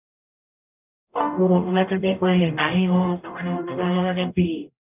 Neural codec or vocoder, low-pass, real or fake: codec, 44.1 kHz, 0.9 kbps, DAC; 3.6 kHz; fake